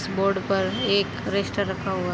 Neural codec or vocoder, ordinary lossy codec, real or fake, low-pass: none; none; real; none